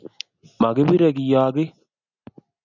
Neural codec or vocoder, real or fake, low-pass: none; real; 7.2 kHz